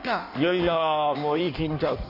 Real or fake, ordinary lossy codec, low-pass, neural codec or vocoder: fake; none; 5.4 kHz; codec, 16 kHz in and 24 kHz out, 1.1 kbps, FireRedTTS-2 codec